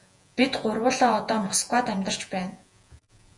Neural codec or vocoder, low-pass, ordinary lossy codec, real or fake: vocoder, 48 kHz, 128 mel bands, Vocos; 10.8 kHz; MP3, 64 kbps; fake